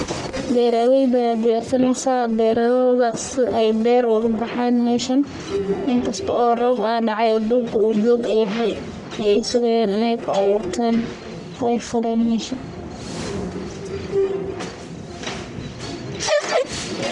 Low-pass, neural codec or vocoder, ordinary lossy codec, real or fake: 10.8 kHz; codec, 44.1 kHz, 1.7 kbps, Pupu-Codec; none; fake